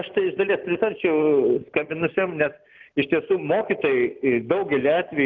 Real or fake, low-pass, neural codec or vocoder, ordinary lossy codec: real; 7.2 kHz; none; Opus, 24 kbps